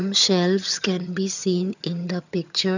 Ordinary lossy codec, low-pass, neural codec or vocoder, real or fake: none; 7.2 kHz; vocoder, 22.05 kHz, 80 mel bands, HiFi-GAN; fake